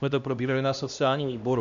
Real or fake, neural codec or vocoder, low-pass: fake; codec, 16 kHz, 1 kbps, X-Codec, HuBERT features, trained on LibriSpeech; 7.2 kHz